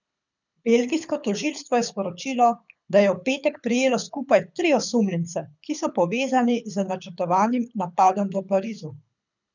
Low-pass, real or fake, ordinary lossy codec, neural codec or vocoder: 7.2 kHz; fake; none; codec, 24 kHz, 6 kbps, HILCodec